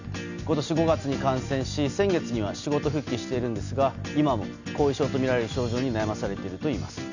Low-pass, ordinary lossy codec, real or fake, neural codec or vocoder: 7.2 kHz; none; real; none